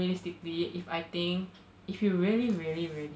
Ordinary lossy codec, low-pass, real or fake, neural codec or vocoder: none; none; real; none